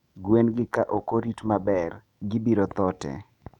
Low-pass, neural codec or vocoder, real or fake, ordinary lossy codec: 19.8 kHz; autoencoder, 48 kHz, 128 numbers a frame, DAC-VAE, trained on Japanese speech; fake; none